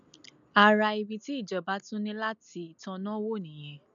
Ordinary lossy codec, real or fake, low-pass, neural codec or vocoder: none; real; 7.2 kHz; none